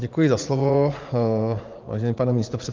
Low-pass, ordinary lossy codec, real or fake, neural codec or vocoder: 7.2 kHz; Opus, 32 kbps; fake; vocoder, 44.1 kHz, 80 mel bands, Vocos